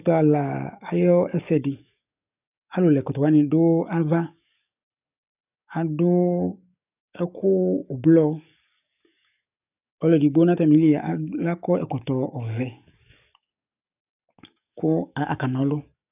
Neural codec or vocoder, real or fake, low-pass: codec, 44.1 kHz, 7.8 kbps, DAC; fake; 3.6 kHz